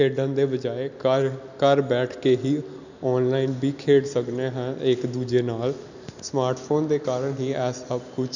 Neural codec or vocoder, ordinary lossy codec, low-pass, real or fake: none; none; 7.2 kHz; real